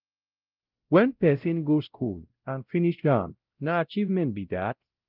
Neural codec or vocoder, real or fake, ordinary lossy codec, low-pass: codec, 16 kHz, 0.5 kbps, X-Codec, WavLM features, trained on Multilingual LibriSpeech; fake; Opus, 32 kbps; 5.4 kHz